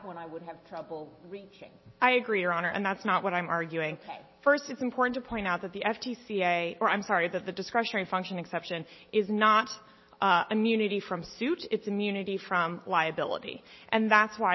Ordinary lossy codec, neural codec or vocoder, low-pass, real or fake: MP3, 24 kbps; none; 7.2 kHz; real